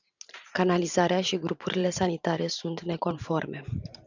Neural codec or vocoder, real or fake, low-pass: vocoder, 44.1 kHz, 128 mel bands every 512 samples, BigVGAN v2; fake; 7.2 kHz